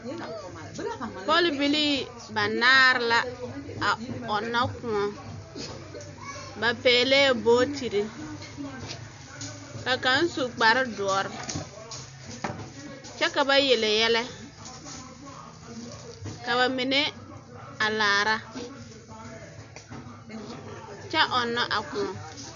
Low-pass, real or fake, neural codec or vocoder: 7.2 kHz; real; none